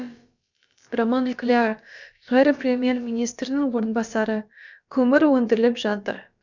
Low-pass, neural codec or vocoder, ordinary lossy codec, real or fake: 7.2 kHz; codec, 16 kHz, about 1 kbps, DyCAST, with the encoder's durations; none; fake